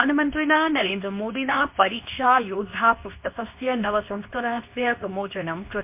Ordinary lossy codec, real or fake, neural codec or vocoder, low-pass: MP3, 32 kbps; fake; codec, 24 kHz, 0.9 kbps, WavTokenizer, medium speech release version 2; 3.6 kHz